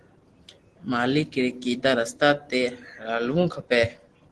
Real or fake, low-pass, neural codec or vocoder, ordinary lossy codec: real; 10.8 kHz; none; Opus, 16 kbps